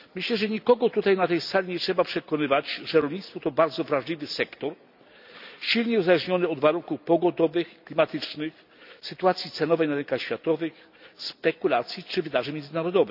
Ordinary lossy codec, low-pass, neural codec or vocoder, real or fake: none; 5.4 kHz; none; real